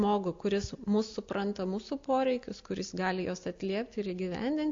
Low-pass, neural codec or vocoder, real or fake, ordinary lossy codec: 7.2 kHz; none; real; AAC, 48 kbps